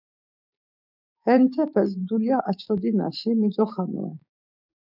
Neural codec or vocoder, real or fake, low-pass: codec, 16 kHz, 6 kbps, DAC; fake; 5.4 kHz